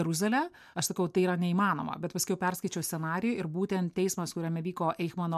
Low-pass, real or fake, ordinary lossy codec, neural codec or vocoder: 14.4 kHz; real; MP3, 96 kbps; none